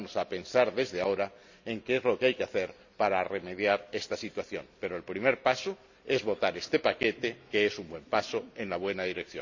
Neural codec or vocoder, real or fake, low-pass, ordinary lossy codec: none; real; 7.2 kHz; none